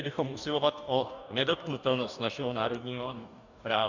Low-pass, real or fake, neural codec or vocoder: 7.2 kHz; fake; codec, 44.1 kHz, 2.6 kbps, DAC